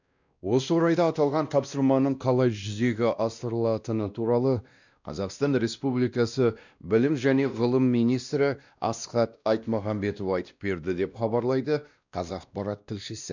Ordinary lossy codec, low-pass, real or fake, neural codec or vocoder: none; 7.2 kHz; fake; codec, 16 kHz, 1 kbps, X-Codec, WavLM features, trained on Multilingual LibriSpeech